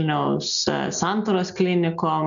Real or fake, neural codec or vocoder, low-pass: real; none; 7.2 kHz